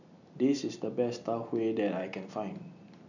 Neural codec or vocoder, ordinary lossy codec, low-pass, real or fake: none; none; 7.2 kHz; real